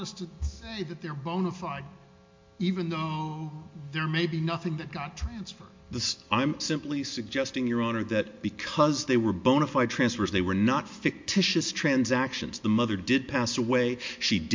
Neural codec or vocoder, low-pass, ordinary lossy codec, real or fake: none; 7.2 kHz; MP3, 48 kbps; real